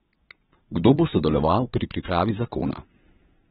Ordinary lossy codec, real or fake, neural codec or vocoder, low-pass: AAC, 16 kbps; fake; vocoder, 22.05 kHz, 80 mel bands, Vocos; 9.9 kHz